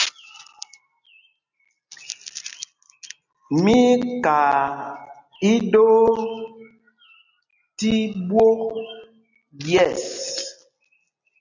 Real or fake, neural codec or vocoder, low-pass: real; none; 7.2 kHz